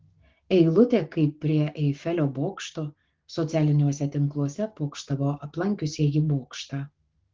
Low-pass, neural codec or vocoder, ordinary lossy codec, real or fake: 7.2 kHz; codec, 16 kHz, 6 kbps, DAC; Opus, 16 kbps; fake